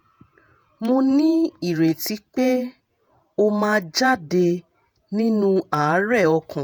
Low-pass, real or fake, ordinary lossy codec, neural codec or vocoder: none; fake; none; vocoder, 48 kHz, 128 mel bands, Vocos